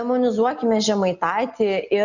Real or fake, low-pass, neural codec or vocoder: real; 7.2 kHz; none